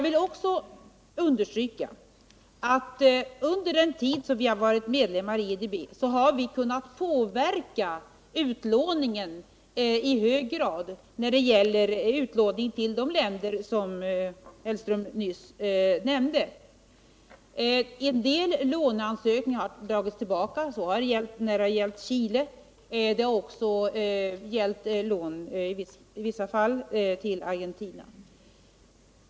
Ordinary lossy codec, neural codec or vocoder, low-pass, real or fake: none; none; none; real